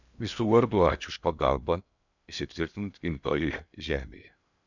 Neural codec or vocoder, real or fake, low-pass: codec, 16 kHz in and 24 kHz out, 0.8 kbps, FocalCodec, streaming, 65536 codes; fake; 7.2 kHz